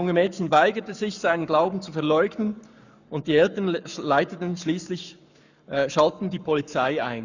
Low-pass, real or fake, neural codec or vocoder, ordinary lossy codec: 7.2 kHz; fake; codec, 44.1 kHz, 7.8 kbps, Pupu-Codec; none